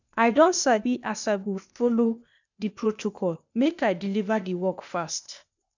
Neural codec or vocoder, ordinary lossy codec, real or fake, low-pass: codec, 16 kHz, 0.8 kbps, ZipCodec; none; fake; 7.2 kHz